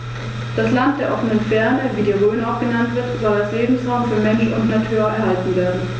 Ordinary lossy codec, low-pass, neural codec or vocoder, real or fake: none; none; none; real